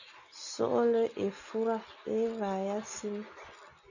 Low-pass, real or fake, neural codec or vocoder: 7.2 kHz; real; none